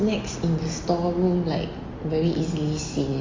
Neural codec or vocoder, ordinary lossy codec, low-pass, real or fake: none; Opus, 32 kbps; 7.2 kHz; real